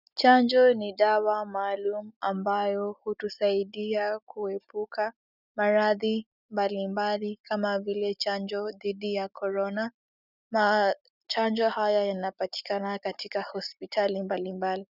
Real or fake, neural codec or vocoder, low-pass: real; none; 5.4 kHz